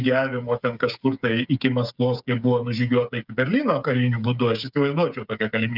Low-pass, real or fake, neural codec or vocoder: 5.4 kHz; fake; codec, 16 kHz, 8 kbps, FreqCodec, smaller model